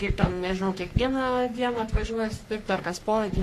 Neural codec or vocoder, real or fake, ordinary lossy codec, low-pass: codec, 44.1 kHz, 3.4 kbps, Pupu-Codec; fake; AAC, 64 kbps; 14.4 kHz